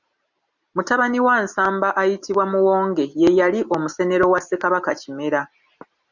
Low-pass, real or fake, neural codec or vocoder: 7.2 kHz; real; none